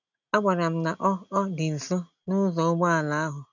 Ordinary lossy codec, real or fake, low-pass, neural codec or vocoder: none; real; 7.2 kHz; none